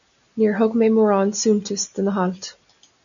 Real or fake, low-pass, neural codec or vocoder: real; 7.2 kHz; none